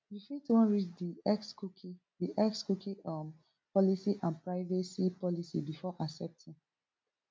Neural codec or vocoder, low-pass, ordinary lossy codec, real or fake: none; none; none; real